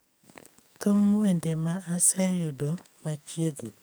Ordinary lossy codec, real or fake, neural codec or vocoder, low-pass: none; fake; codec, 44.1 kHz, 2.6 kbps, SNAC; none